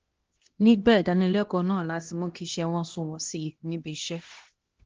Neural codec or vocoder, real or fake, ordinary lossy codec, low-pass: codec, 16 kHz, 1 kbps, X-Codec, HuBERT features, trained on LibriSpeech; fake; Opus, 16 kbps; 7.2 kHz